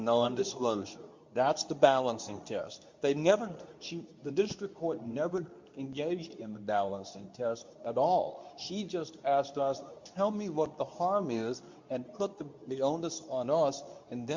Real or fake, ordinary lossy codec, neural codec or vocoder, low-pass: fake; MP3, 64 kbps; codec, 24 kHz, 0.9 kbps, WavTokenizer, medium speech release version 2; 7.2 kHz